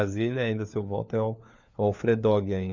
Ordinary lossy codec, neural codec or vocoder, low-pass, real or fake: none; codec, 16 kHz, 4 kbps, FunCodec, trained on LibriTTS, 50 frames a second; 7.2 kHz; fake